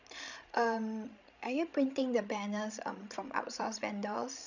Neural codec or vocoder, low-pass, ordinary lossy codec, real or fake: codec, 16 kHz, 16 kbps, FreqCodec, larger model; 7.2 kHz; none; fake